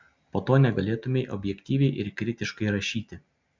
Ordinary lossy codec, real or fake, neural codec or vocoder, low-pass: MP3, 64 kbps; real; none; 7.2 kHz